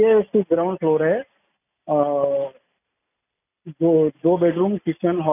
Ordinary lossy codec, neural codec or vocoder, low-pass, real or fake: none; none; 3.6 kHz; real